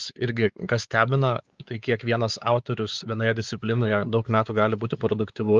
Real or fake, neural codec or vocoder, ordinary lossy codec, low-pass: fake; codec, 16 kHz, 4 kbps, X-Codec, WavLM features, trained on Multilingual LibriSpeech; Opus, 32 kbps; 7.2 kHz